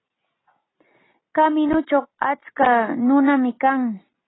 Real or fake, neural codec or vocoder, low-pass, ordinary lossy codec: real; none; 7.2 kHz; AAC, 16 kbps